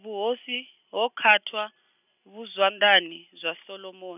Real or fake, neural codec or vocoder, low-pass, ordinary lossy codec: real; none; 3.6 kHz; none